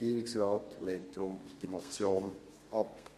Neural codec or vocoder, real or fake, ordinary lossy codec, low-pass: codec, 32 kHz, 1.9 kbps, SNAC; fake; MP3, 64 kbps; 14.4 kHz